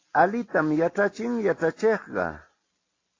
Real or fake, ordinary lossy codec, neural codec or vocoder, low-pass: real; AAC, 32 kbps; none; 7.2 kHz